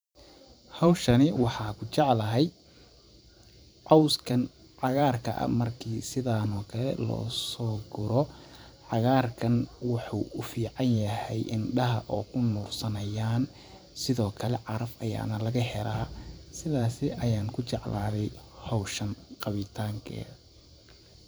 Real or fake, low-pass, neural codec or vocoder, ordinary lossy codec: fake; none; vocoder, 44.1 kHz, 128 mel bands every 512 samples, BigVGAN v2; none